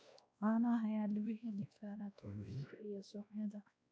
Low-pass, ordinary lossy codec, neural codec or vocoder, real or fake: none; none; codec, 16 kHz, 1 kbps, X-Codec, WavLM features, trained on Multilingual LibriSpeech; fake